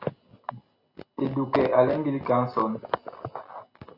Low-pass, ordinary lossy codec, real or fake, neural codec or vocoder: 5.4 kHz; AAC, 48 kbps; real; none